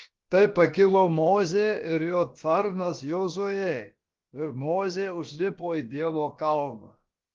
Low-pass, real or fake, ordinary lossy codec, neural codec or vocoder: 7.2 kHz; fake; Opus, 32 kbps; codec, 16 kHz, about 1 kbps, DyCAST, with the encoder's durations